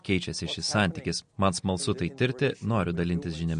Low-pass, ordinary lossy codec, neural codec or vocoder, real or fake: 9.9 kHz; MP3, 48 kbps; none; real